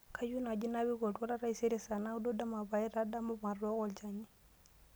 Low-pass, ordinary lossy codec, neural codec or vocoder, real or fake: none; none; none; real